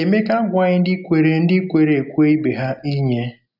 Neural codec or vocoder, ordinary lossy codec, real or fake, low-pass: none; none; real; 5.4 kHz